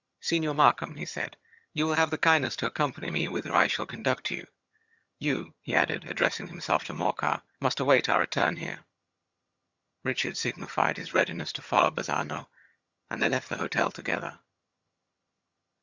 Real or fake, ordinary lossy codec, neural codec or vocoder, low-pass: fake; Opus, 64 kbps; vocoder, 22.05 kHz, 80 mel bands, HiFi-GAN; 7.2 kHz